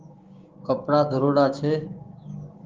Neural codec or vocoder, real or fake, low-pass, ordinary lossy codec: codec, 16 kHz, 6 kbps, DAC; fake; 7.2 kHz; Opus, 32 kbps